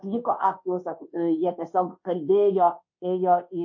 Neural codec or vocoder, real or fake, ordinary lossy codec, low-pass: codec, 24 kHz, 1.2 kbps, DualCodec; fake; MP3, 32 kbps; 7.2 kHz